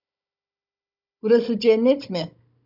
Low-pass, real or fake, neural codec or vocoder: 5.4 kHz; fake; codec, 16 kHz, 16 kbps, FunCodec, trained on Chinese and English, 50 frames a second